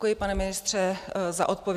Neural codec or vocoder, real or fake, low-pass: none; real; 14.4 kHz